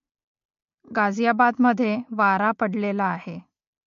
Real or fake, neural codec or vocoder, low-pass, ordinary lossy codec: real; none; 7.2 kHz; MP3, 48 kbps